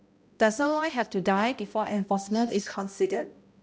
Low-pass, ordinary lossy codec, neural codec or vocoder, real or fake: none; none; codec, 16 kHz, 0.5 kbps, X-Codec, HuBERT features, trained on balanced general audio; fake